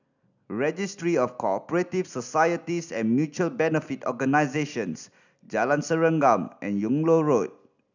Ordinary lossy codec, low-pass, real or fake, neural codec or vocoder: none; 7.2 kHz; real; none